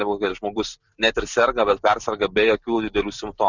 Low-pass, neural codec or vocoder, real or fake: 7.2 kHz; none; real